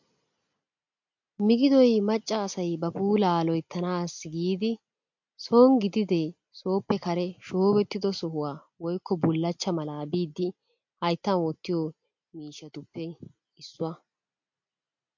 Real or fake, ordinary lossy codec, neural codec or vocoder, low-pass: real; MP3, 48 kbps; none; 7.2 kHz